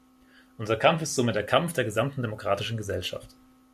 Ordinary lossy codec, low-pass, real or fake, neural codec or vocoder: MP3, 96 kbps; 14.4 kHz; real; none